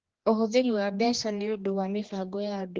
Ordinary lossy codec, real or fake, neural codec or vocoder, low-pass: Opus, 16 kbps; fake; codec, 16 kHz, 2 kbps, X-Codec, HuBERT features, trained on general audio; 7.2 kHz